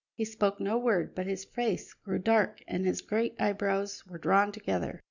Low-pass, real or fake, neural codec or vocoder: 7.2 kHz; real; none